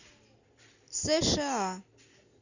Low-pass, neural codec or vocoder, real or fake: 7.2 kHz; none; real